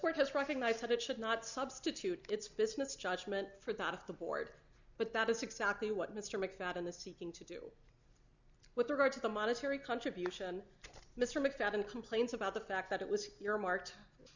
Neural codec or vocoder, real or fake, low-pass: none; real; 7.2 kHz